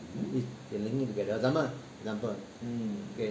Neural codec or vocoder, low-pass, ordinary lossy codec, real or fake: none; none; none; real